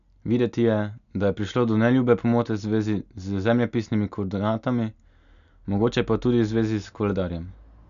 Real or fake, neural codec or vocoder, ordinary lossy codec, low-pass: real; none; none; 7.2 kHz